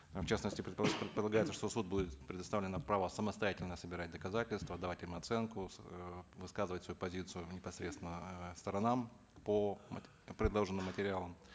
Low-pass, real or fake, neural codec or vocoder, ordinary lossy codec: none; real; none; none